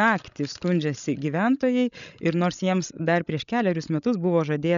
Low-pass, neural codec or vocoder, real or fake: 7.2 kHz; codec, 16 kHz, 16 kbps, FreqCodec, larger model; fake